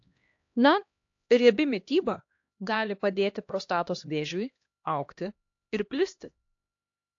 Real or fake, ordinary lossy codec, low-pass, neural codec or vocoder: fake; AAC, 48 kbps; 7.2 kHz; codec, 16 kHz, 1 kbps, X-Codec, HuBERT features, trained on LibriSpeech